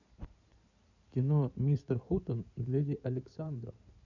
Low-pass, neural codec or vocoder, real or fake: 7.2 kHz; codec, 16 kHz in and 24 kHz out, 2.2 kbps, FireRedTTS-2 codec; fake